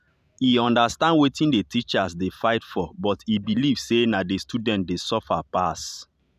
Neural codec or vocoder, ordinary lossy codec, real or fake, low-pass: vocoder, 44.1 kHz, 128 mel bands every 512 samples, BigVGAN v2; none; fake; 14.4 kHz